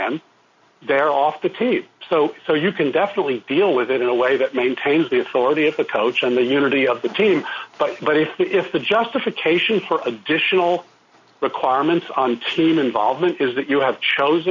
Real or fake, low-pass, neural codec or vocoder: real; 7.2 kHz; none